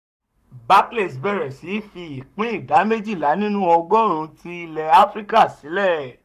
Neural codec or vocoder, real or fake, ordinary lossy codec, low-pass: codec, 44.1 kHz, 7.8 kbps, Pupu-Codec; fake; none; 14.4 kHz